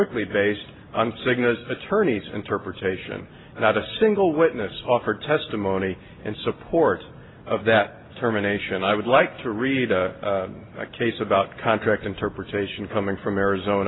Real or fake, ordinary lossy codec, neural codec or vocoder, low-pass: real; AAC, 16 kbps; none; 7.2 kHz